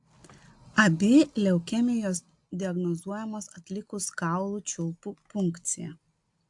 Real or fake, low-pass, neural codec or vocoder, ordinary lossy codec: real; 10.8 kHz; none; AAC, 64 kbps